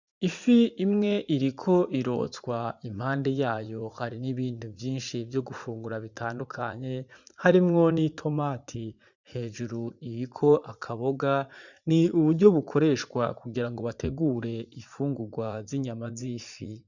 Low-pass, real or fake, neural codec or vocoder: 7.2 kHz; fake; vocoder, 44.1 kHz, 80 mel bands, Vocos